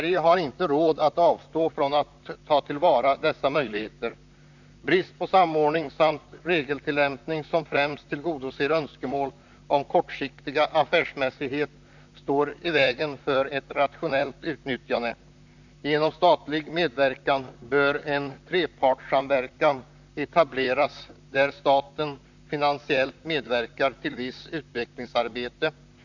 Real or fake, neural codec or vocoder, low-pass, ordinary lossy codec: fake; vocoder, 44.1 kHz, 128 mel bands, Pupu-Vocoder; 7.2 kHz; none